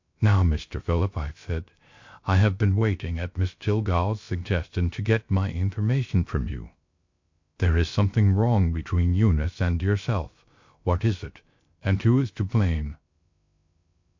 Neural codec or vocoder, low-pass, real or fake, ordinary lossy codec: codec, 16 kHz, 0.3 kbps, FocalCodec; 7.2 kHz; fake; MP3, 48 kbps